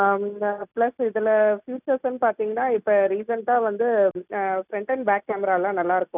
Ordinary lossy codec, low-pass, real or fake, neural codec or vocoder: none; 3.6 kHz; real; none